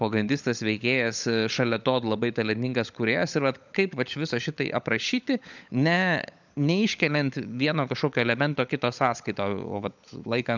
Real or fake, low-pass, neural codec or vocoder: fake; 7.2 kHz; codec, 16 kHz, 16 kbps, FunCodec, trained on LibriTTS, 50 frames a second